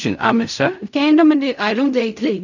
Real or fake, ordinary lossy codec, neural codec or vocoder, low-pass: fake; none; codec, 16 kHz in and 24 kHz out, 0.4 kbps, LongCat-Audio-Codec, fine tuned four codebook decoder; 7.2 kHz